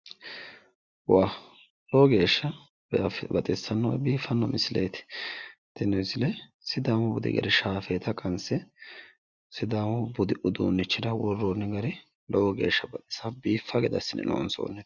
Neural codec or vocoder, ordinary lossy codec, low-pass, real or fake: none; Opus, 64 kbps; 7.2 kHz; real